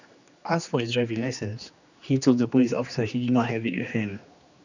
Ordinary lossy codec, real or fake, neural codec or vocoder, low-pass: none; fake; codec, 16 kHz, 2 kbps, X-Codec, HuBERT features, trained on general audio; 7.2 kHz